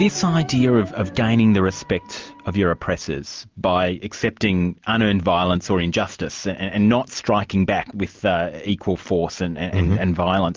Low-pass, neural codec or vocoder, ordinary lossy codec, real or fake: 7.2 kHz; none; Opus, 32 kbps; real